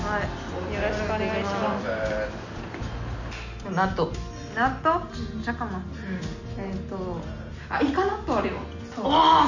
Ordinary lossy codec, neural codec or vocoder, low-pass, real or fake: none; none; 7.2 kHz; real